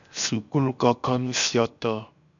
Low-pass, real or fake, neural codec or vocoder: 7.2 kHz; fake; codec, 16 kHz, 0.7 kbps, FocalCodec